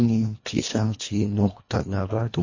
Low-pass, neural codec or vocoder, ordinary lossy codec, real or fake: 7.2 kHz; codec, 24 kHz, 1.5 kbps, HILCodec; MP3, 32 kbps; fake